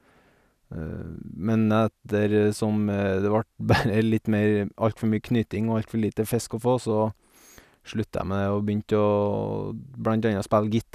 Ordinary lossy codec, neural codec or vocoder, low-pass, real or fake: none; none; 14.4 kHz; real